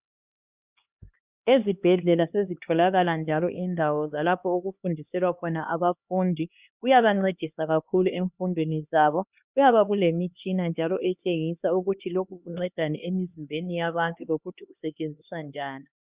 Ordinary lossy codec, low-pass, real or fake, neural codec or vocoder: Opus, 64 kbps; 3.6 kHz; fake; codec, 16 kHz, 2 kbps, X-Codec, HuBERT features, trained on LibriSpeech